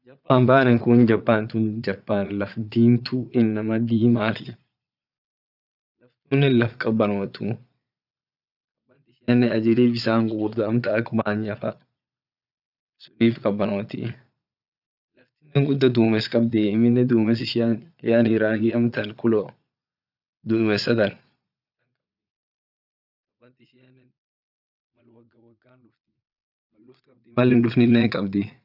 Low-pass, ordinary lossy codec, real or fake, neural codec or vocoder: 5.4 kHz; none; fake; vocoder, 22.05 kHz, 80 mel bands, Vocos